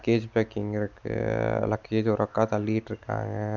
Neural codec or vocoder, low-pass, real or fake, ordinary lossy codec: none; 7.2 kHz; real; MP3, 64 kbps